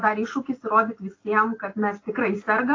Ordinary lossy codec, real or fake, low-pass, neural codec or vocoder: AAC, 32 kbps; real; 7.2 kHz; none